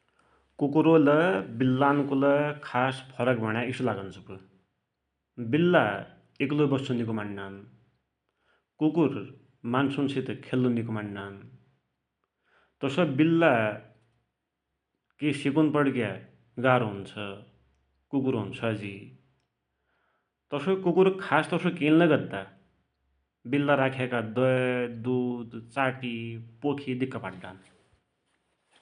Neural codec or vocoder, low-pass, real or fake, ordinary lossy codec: none; 9.9 kHz; real; none